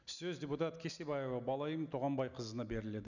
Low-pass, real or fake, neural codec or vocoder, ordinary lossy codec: 7.2 kHz; real; none; none